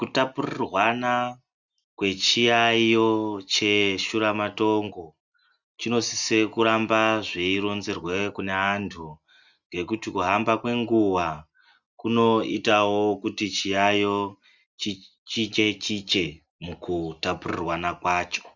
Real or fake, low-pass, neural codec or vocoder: real; 7.2 kHz; none